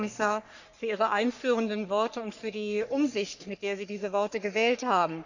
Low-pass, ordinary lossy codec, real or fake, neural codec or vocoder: 7.2 kHz; none; fake; codec, 44.1 kHz, 3.4 kbps, Pupu-Codec